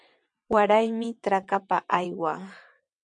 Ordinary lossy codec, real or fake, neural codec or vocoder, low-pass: MP3, 64 kbps; fake; vocoder, 22.05 kHz, 80 mel bands, WaveNeXt; 9.9 kHz